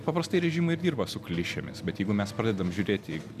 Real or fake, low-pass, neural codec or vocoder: real; 14.4 kHz; none